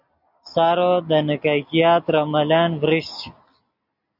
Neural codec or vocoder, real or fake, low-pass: none; real; 5.4 kHz